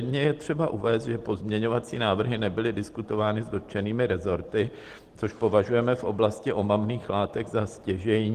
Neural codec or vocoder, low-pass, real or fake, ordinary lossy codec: none; 14.4 kHz; real; Opus, 16 kbps